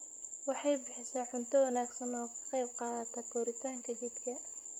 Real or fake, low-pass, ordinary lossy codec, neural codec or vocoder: fake; 19.8 kHz; none; vocoder, 44.1 kHz, 128 mel bands, Pupu-Vocoder